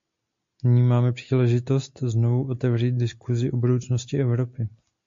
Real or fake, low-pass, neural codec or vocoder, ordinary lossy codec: real; 7.2 kHz; none; MP3, 48 kbps